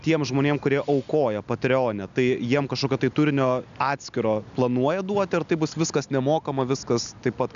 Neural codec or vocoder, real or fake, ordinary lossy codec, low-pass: none; real; MP3, 96 kbps; 7.2 kHz